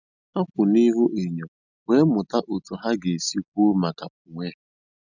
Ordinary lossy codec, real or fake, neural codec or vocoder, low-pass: none; real; none; 7.2 kHz